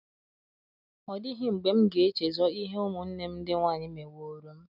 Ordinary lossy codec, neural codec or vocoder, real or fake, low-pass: none; none; real; 5.4 kHz